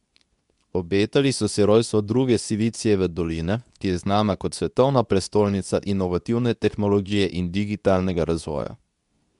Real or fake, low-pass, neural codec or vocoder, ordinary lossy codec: fake; 10.8 kHz; codec, 24 kHz, 0.9 kbps, WavTokenizer, medium speech release version 2; MP3, 96 kbps